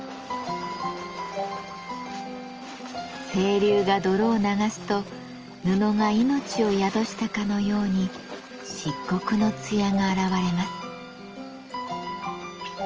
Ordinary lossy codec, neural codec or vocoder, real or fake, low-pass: Opus, 24 kbps; none; real; 7.2 kHz